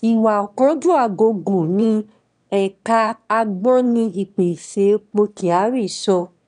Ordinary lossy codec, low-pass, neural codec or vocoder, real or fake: none; 9.9 kHz; autoencoder, 22.05 kHz, a latent of 192 numbers a frame, VITS, trained on one speaker; fake